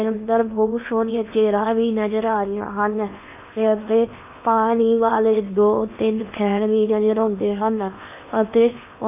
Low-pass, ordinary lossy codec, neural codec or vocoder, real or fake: 3.6 kHz; none; codec, 16 kHz in and 24 kHz out, 0.8 kbps, FocalCodec, streaming, 65536 codes; fake